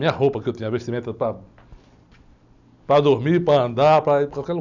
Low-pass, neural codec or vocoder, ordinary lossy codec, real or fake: 7.2 kHz; none; none; real